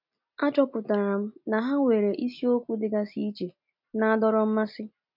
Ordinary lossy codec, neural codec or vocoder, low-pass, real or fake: MP3, 48 kbps; none; 5.4 kHz; real